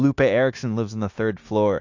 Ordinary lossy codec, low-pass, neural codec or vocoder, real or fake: AAC, 48 kbps; 7.2 kHz; codec, 16 kHz, 0.9 kbps, LongCat-Audio-Codec; fake